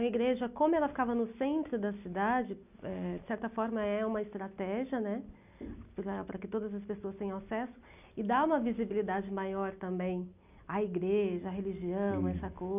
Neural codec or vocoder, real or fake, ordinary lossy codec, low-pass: none; real; none; 3.6 kHz